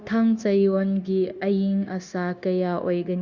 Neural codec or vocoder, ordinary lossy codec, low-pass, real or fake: codec, 16 kHz, 0.9 kbps, LongCat-Audio-Codec; Opus, 64 kbps; 7.2 kHz; fake